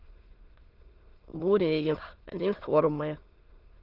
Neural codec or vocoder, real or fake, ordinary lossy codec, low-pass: autoencoder, 22.05 kHz, a latent of 192 numbers a frame, VITS, trained on many speakers; fake; Opus, 16 kbps; 5.4 kHz